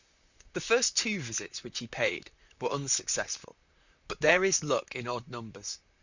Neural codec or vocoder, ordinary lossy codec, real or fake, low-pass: vocoder, 44.1 kHz, 128 mel bands, Pupu-Vocoder; Opus, 64 kbps; fake; 7.2 kHz